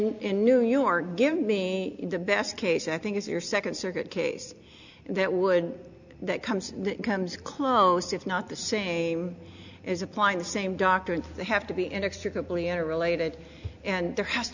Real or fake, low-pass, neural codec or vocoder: real; 7.2 kHz; none